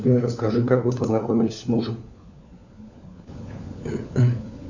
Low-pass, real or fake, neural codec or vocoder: 7.2 kHz; fake; codec, 16 kHz, 4 kbps, FunCodec, trained on LibriTTS, 50 frames a second